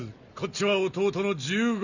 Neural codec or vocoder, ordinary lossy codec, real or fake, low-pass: none; none; real; 7.2 kHz